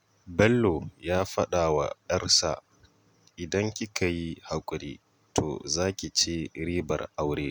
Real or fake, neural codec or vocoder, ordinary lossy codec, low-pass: real; none; none; none